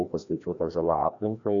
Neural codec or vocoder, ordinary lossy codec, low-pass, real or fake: codec, 16 kHz, 1 kbps, FreqCodec, larger model; MP3, 96 kbps; 7.2 kHz; fake